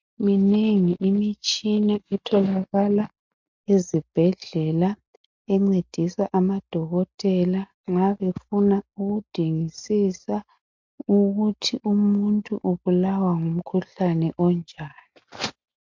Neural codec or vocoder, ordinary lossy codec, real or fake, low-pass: none; MP3, 48 kbps; real; 7.2 kHz